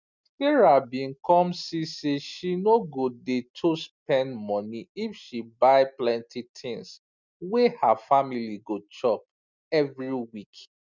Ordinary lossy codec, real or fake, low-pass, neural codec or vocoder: none; real; 7.2 kHz; none